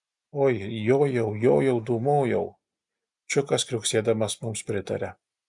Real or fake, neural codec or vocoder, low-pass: real; none; 10.8 kHz